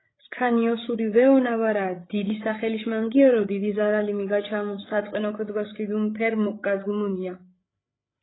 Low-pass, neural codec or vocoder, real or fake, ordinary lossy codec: 7.2 kHz; codec, 16 kHz, 8 kbps, FreqCodec, larger model; fake; AAC, 16 kbps